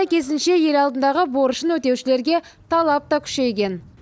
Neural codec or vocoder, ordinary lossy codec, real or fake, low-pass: none; none; real; none